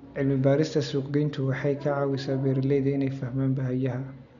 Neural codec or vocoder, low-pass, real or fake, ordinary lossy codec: none; 7.2 kHz; real; none